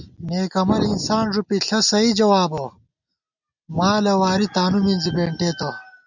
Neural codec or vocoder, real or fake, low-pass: none; real; 7.2 kHz